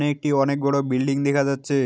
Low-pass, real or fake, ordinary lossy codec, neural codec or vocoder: none; real; none; none